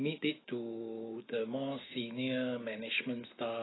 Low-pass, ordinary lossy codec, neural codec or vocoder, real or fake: 7.2 kHz; AAC, 16 kbps; autoencoder, 48 kHz, 128 numbers a frame, DAC-VAE, trained on Japanese speech; fake